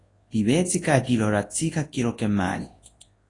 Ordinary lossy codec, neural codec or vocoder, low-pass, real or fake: AAC, 32 kbps; codec, 24 kHz, 0.9 kbps, WavTokenizer, large speech release; 10.8 kHz; fake